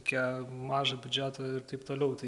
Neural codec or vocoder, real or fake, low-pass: none; real; 10.8 kHz